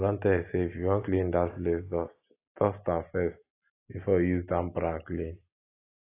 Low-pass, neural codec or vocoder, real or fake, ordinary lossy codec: 3.6 kHz; none; real; AAC, 24 kbps